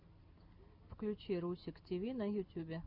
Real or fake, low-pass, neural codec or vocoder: real; 5.4 kHz; none